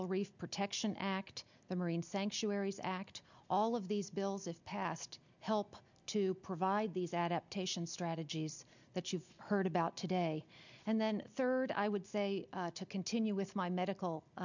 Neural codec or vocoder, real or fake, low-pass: none; real; 7.2 kHz